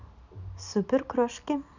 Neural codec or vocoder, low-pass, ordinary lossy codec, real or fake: none; 7.2 kHz; none; real